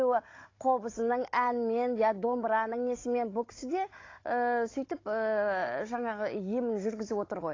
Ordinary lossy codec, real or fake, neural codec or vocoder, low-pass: AAC, 32 kbps; fake; codec, 16 kHz, 16 kbps, FunCodec, trained on Chinese and English, 50 frames a second; 7.2 kHz